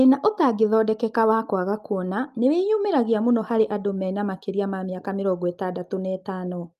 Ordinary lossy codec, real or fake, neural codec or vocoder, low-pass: Opus, 32 kbps; real; none; 14.4 kHz